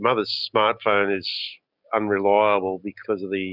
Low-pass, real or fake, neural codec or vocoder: 5.4 kHz; real; none